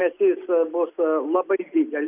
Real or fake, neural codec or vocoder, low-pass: real; none; 3.6 kHz